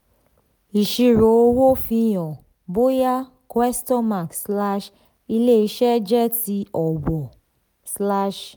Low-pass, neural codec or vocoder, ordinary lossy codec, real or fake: none; none; none; real